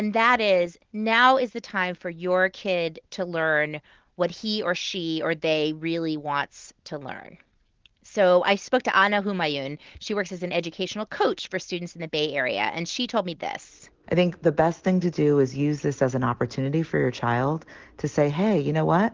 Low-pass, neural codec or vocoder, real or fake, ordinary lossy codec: 7.2 kHz; none; real; Opus, 16 kbps